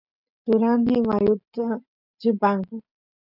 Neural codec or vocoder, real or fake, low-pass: none; real; 5.4 kHz